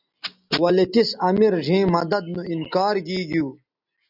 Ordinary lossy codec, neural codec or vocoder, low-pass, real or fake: AAC, 48 kbps; none; 5.4 kHz; real